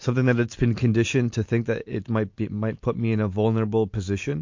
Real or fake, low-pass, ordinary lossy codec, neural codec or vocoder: real; 7.2 kHz; MP3, 48 kbps; none